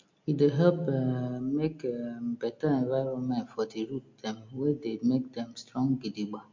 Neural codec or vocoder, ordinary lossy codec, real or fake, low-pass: none; MP3, 48 kbps; real; 7.2 kHz